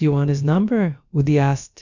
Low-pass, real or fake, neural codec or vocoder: 7.2 kHz; fake; codec, 16 kHz, about 1 kbps, DyCAST, with the encoder's durations